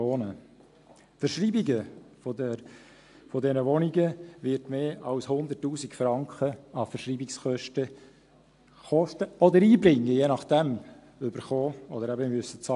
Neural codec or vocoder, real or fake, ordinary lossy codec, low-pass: none; real; AAC, 64 kbps; 10.8 kHz